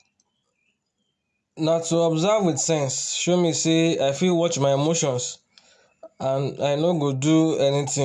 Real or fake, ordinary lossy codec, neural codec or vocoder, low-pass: real; none; none; none